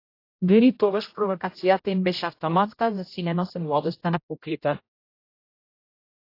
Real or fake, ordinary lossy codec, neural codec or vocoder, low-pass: fake; AAC, 32 kbps; codec, 16 kHz, 0.5 kbps, X-Codec, HuBERT features, trained on general audio; 5.4 kHz